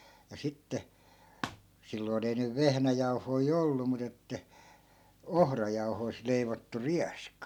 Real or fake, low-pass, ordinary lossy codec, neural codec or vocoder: real; 19.8 kHz; none; none